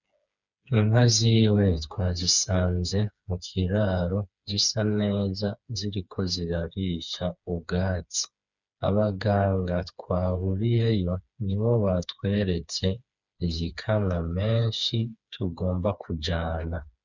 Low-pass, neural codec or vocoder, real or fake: 7.2 kHz; codec, 16 kHz, 4 kbps, FreqCodec, smaller model; fake